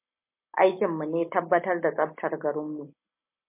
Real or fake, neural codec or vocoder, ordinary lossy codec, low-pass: real; none; MP3, 24 kbps; 3.6 kHz